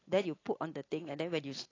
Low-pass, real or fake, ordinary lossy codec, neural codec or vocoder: 7.2 kHz; real; AAC, 32 kbps; none